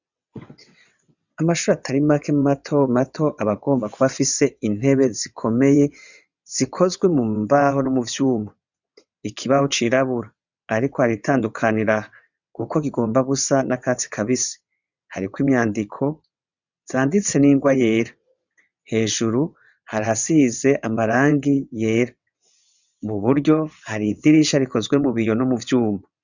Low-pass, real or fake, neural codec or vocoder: 7.2 kHz; fake; vocoder, 22.05 kHz, 80 mel bands, WaveNeXt